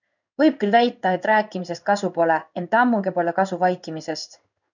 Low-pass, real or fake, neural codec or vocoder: 7.2 kHz; fake; codec, 16 kHz in and 24 kHz out, 1 kbps, XY-Tokenizer